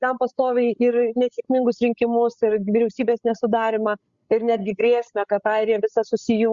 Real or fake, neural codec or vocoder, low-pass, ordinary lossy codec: fake; codec, 16 kHz, 8 kbps, FreqCodec, larger model; 7.2 kHz; Opus, 64 kbps